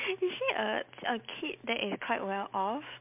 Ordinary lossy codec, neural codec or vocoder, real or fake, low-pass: MP3, 32 kbps; none; real; 3.6 kHz